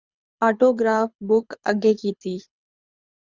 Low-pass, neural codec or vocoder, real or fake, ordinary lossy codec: 7.2 kHz; codec, 24 kHz, 6 kbps, HILCodec; fake; Opus, 64 kbps